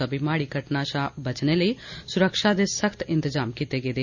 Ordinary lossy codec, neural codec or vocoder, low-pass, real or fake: none; none; 7.2 kHz; real